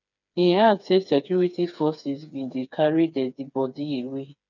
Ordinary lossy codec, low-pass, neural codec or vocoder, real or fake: none; 7.2 kHz; codec, 16 kHz, 4 kbps, FreqCodec, smaller model; fake